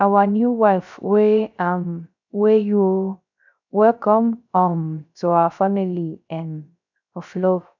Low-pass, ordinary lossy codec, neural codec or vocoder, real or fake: 7.2 kHz; none; codec, 16 kHz, 0.3 kbps, FocalCodec; fake